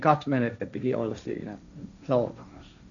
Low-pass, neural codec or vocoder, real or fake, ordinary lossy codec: 7.2 kHz; codec, 16 kHz, 1.1 kbps, Voila-Tokenizer; fake; none